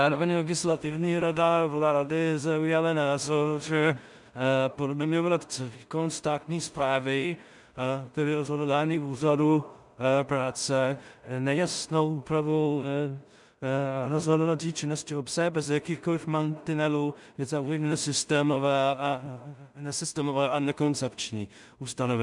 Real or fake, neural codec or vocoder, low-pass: fake; codec, 16 kHz in and 24 kHz out, 0.4 kbps, LongCat-Audio-Codec, two codebook decoder; 10.8 kHz